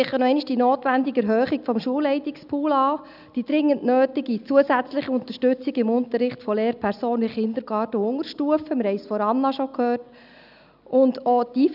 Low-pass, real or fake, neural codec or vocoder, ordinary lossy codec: 5.4 kHz; real; none; none